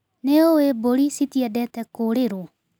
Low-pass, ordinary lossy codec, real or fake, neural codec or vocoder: none; none; real; none